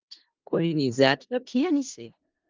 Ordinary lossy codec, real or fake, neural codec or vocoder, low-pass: Opus, 32 kbps; fake; codec, 16 kHz in and 24 kHz out, 0.4 kbps, LongCat-Audio-Codec, four codebook decoder; 7.2 kHz